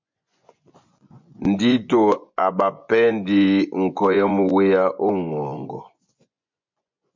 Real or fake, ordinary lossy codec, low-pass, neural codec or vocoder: fake; MP3, 48 kbps; 7.2 kHz; vocoder, 24 kHz, 100 mel bands, Vocos